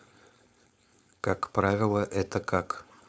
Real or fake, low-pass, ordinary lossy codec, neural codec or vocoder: fake; none; none; codec, 16 kHz, 4.8 kbps, FACodec